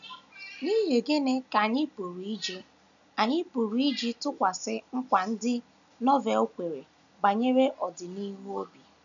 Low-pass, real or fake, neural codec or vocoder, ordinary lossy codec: 7.2 kHz; real; none; none